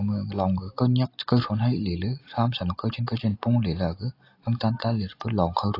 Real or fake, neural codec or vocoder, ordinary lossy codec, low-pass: real; none; AAC, 32 kbps; 5.4 kHz